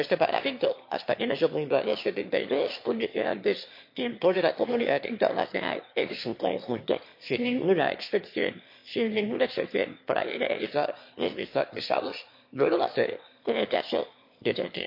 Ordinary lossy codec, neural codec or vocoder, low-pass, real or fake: MP3, 32 kbps; autoencoder, 22.05 kHz, a latent of 192 numbers a frame, VITS, trained on one speaker; 5.4 kHz; fake